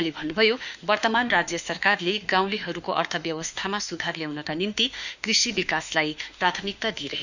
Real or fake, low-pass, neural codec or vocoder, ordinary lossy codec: fake; 7.2 kHz; autoencoder, 48 kHz, 32 numbers a frame, DAC-VAE, trained on Japanese speech; none